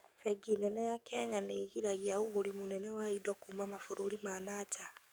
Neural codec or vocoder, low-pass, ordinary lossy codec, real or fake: codec, 44.1 kHz, 7.8 kbps, DAC; none; none; fake